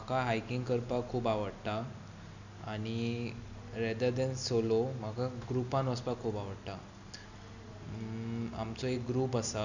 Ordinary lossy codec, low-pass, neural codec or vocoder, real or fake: none; 7.2 kHz; none; real